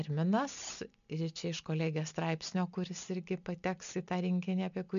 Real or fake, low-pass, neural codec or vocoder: real; 7.2 kHz; none